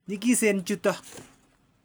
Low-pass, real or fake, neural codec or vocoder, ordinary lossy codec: none; real; none; none